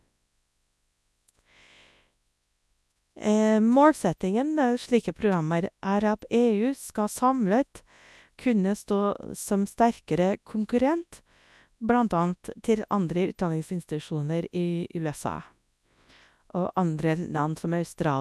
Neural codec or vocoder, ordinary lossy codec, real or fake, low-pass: codec, 24 kHz, 0.9 kbps, WavTokenizer, large speech release; none; fake; none